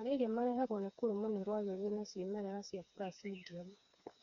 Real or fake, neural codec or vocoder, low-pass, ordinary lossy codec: fake; codec, 16 kHz, 4 kbps, FreqCodec, smaller model; 7.2 kHz; none